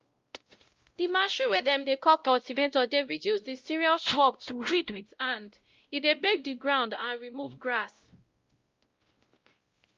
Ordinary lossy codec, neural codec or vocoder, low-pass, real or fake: Opus, 32 kbps; codec, 16 kHz, 0.5 kbps, X-Codec, WavLM features, trained on Multilingual LibriSpeech; 7.2 kHz; fake